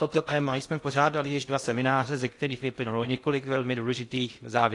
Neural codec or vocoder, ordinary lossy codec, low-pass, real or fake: codec, 16 kHz in and 24 kHz out, 0.8 kbps, FocalCodec, streaming, 65536 codes; AAC, 48 kbps; 10.8 kHz; fake